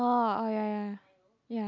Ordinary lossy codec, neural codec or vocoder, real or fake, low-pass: none; none; real; 7.2 kHz